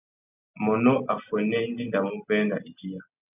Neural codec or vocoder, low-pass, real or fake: none; 3.6 kHz; real